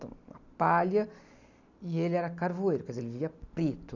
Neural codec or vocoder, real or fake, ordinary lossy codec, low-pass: none; real; none; 7.2 kHz